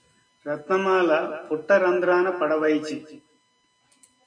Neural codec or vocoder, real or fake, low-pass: none; real; 9.9 kHz